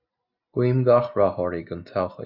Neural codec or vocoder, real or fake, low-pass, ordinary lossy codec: none; real; 5.4 kHz; Opus, 64 kbps